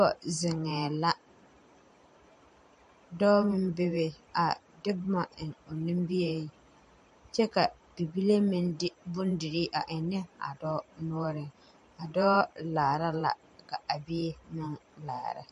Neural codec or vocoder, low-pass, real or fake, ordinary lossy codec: vocoder, 44.1 kHz, 128 mel bands every 512 samples, BigVGAN v2; 14.4 kHz; fake; MP3, 48 kbps